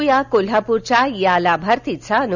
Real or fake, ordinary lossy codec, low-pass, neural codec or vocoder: real; none; 7.2 kHz; none